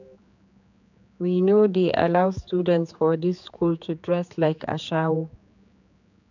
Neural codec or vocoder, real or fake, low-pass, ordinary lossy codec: codec, 16 kHz, 4 kbps, X-Codec, HuBERT features, trained on general audio; fake; 7.2 kHz; none